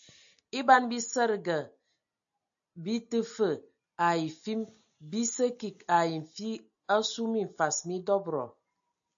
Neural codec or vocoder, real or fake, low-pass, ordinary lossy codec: none; real; 7.2 kHz; AAC, 64 kbps